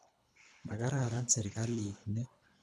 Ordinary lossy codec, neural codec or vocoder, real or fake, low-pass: none; codec, 24 kHz, 3 kbps, HILCodec; fake; none